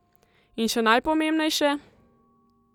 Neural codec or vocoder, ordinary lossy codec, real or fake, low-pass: none; none; real; 19.8 kHz